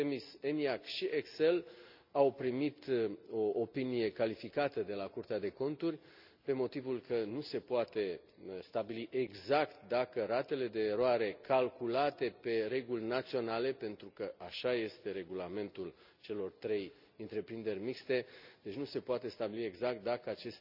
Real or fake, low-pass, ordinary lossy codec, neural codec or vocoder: real; 5.4 kHz; none; none